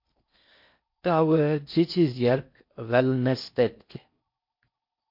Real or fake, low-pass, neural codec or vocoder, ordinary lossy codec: fake; 5.4 kHz; codec, 16 kHz in and 24 kHz out, 0.6 kbps, FocalCodec, streaming, 4096 codes; MP3, 32 kbps